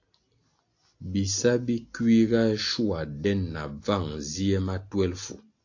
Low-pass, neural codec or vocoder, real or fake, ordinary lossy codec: 7.2 kHz; none; real; AAC, 48 kbps